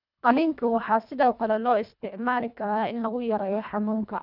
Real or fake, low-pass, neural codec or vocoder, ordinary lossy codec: fake; 5.4 kHz; codec, 24 kHz, 1.5 kbps, HILCodec; none